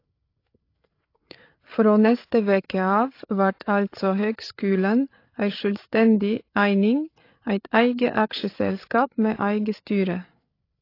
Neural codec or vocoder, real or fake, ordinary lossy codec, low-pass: codec, 16 kHz, 8 kbps, FreqCodec, larger model; fake; AAC, 32 kbps; 5.4 kHz